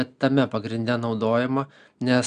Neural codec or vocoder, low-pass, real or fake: none; 9.9 kHz; real